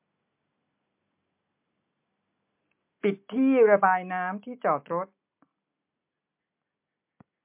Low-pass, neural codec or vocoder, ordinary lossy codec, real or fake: 3.6 kHz; none; MP3, 32 kbps; real